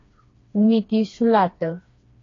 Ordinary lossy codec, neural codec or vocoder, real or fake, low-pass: AAC, 32 kbps; codec, 16 kHz, 2 kbps, FreqCodec, smaller model; fake; 7.2 kHz